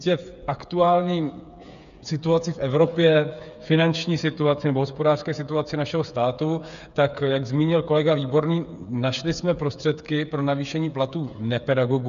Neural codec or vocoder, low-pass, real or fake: codec, 16 kHz, 8 kbps, FreqCodec, smaller model; 7.2 kHz; fake